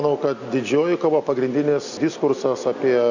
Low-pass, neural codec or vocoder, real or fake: 7.2 kHz; none; real